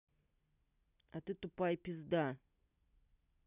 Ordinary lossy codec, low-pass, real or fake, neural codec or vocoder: none; 3.6 kHz; real; none